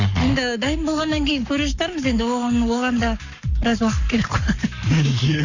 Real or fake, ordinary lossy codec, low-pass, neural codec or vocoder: fake; none; 7.2 kHz; codec, 44.1 kHz, 7.8 kbps, Pupu-Codec